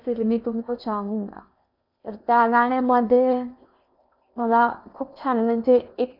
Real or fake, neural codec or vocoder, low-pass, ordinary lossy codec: fake; codec, 16 kHz in and 24 kHz out, 0.8 kbps, FocalCodec, streaming, 65536 codes; 5.4 kHz; none